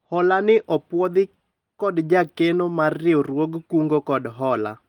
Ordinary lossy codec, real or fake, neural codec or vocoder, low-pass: Opus, 24 kbps; real; none; 19.8 kHz